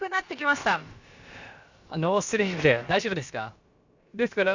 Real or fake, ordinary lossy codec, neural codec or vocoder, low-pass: fake; Opus, 64 kbps; codec, 16 kHz, about 1 kbps, DyCAST, with the encoder's durations; 7.2 kHz